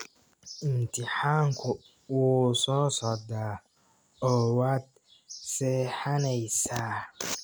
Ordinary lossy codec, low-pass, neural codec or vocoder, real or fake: none; none; none; real